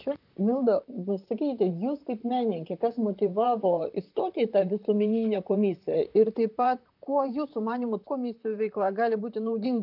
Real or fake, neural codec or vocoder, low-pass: fake; vocoder, 44.1 kHz, 128 mel bands, Pupu-Vocoder; 5.4 kHz